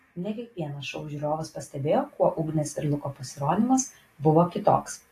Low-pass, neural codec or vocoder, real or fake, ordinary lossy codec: 14.4 kHz; none; real; AAC, 48 kbps